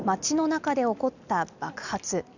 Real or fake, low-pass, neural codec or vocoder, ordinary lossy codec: real; 7.2 kHz; none; none